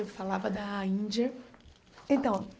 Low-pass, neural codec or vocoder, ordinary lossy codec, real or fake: none; none; none; real